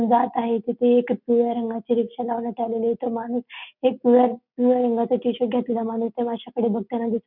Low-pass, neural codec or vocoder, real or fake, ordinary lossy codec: 5.4 kHz; none; real; none